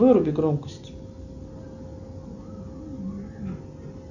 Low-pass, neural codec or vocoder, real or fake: 7.2 kHz; none; real